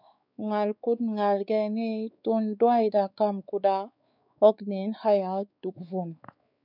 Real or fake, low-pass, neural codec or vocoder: fake; 5.4 kHz; codec, 16 kHz, 4 kbps, X-Codec, WavLM features, trained on Multilingual LibriSpeech